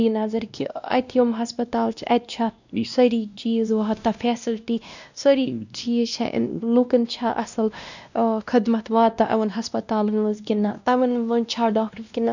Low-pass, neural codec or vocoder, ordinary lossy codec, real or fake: 7.2 kHz; codec, 16 kHz, 1 kbps, X-Codec, WavLM features, trained on Multilingual LibriSpeech; none; fake